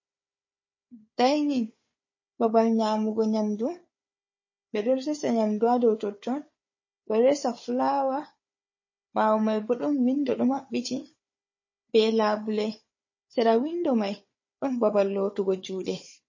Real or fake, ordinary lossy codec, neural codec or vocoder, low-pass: fake; MP3, 32 kbps; codec, 16 kHz, 4 kbps, FunCodec, trained on Chinese and English, 50 frames a second; 7.2 kHz